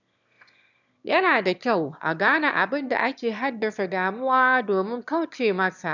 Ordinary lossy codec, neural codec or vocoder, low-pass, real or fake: none; autoencoder, 22.05 kHz, a latent of 192 numbers a frame, VITS, trained on one speaker; 7.2 kHz; fake